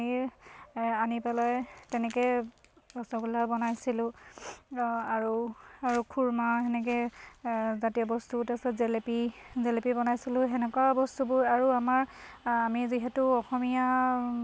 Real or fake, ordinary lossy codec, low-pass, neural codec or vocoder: real; none; none; none